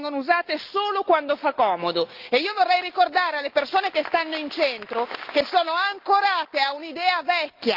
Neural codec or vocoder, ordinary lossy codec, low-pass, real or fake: none; Opus, 32 kbps; 5.4 kHz; real